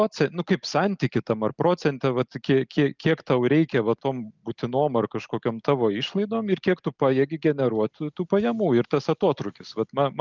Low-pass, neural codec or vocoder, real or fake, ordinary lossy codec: 7.2 kHz; none; real; Opus, 24 kbps